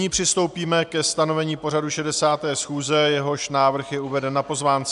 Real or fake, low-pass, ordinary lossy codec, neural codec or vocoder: real; 10.8 kHz; MP3, 96 kbps; none